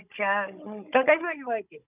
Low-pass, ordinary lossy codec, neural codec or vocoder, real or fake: 3.6 kHz; none; codec, 16 kHz, 16 kbps, FunCodec, trained on Chinese and English, 50 frames a second; fake